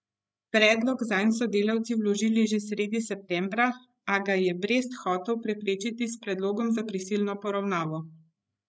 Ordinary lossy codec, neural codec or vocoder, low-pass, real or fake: none; codec, 16 kHz, 16 kbps, FreqCodec, larger model; none; fake